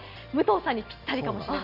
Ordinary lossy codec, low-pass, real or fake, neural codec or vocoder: none; 5.4 kHz; real; none